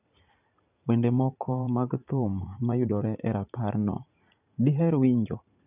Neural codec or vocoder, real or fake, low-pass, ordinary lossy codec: vocoder, 44.1 kHz, 80 mel bands, Vocos; fake; 3.6 kHz; none